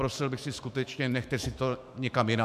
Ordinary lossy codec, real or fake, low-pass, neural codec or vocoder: MP3, 96 kbps; fake; 14.4 kHz; autoencoder, 48 kHz, 128 numbers a frame, DAC-VAE, trained on Japanese speech